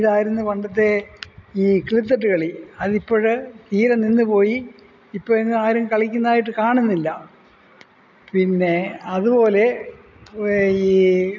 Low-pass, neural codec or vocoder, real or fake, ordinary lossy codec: 7.2 kHz; none; real; none